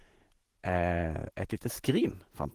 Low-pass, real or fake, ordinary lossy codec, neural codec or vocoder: 14.4 kHz; fake; Opus, 16 kbps; vocoder, 48 kHz, 128 mel bands, Vocos